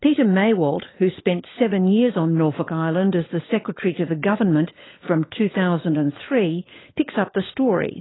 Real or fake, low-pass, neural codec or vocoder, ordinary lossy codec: fake; 7.2 kHz; codec, 16 kHz, 16 kbps, FunCodec, trained on LibriTTS, 50 frames a second; AAC, 16 kbps